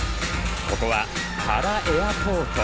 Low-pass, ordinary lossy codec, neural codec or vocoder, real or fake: none; none; none; real